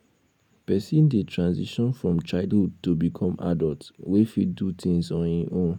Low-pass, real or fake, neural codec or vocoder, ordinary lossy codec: 19.8 kHz; real; none; none